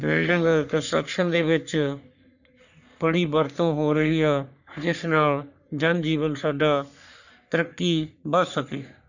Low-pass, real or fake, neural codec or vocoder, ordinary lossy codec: 7.2 kHz; fake; codec, 44.1 kHz, 3.4 kbps, Pupu-Codec; none